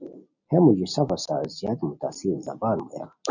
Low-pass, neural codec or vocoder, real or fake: 7.2 kHz; none; real